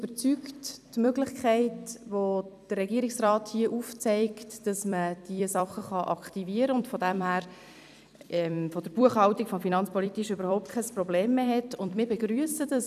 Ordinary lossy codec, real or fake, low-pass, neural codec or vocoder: none; fake; 14.4 kHz; vocoder, 44.1 kHz, 128 mel bands every 256 samples, BigVGAN v2